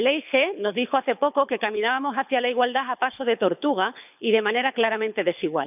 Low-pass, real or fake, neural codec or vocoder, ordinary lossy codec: 3.6 kHz; fake; codec, 24 kHz, 6 kbps, HILCodec; none